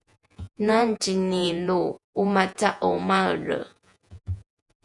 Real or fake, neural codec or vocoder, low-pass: fake; vocoder, 48 kHz, 128 mel bands, Vocos; 10.8 kHz